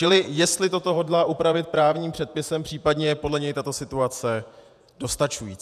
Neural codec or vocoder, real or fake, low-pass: vocoder, 48 kHz, 128 mel bands, Vocos; fake; 14.4 kHz